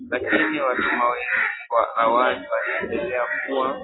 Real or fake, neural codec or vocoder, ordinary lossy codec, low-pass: real; none; AAC, 16 kbps; 7.2 kHz